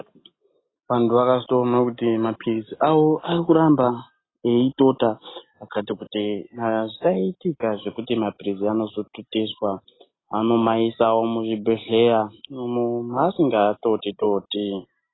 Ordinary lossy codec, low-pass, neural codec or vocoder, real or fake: AAC, 16 kbps; 7.2 kHz; none; real